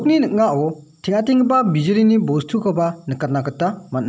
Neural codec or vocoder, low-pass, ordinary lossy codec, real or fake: none; none; none; real